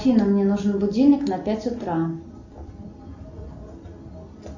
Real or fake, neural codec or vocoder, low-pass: real; none; 7.2 kHz